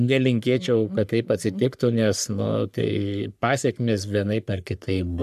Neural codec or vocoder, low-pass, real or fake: codec, 44.1 kHz, 3.4 kbps, Pupu-Codec; 14.4 kHz; fake